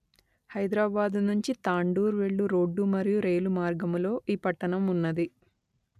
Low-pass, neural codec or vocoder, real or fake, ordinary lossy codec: 14.4 kHz; none; real; none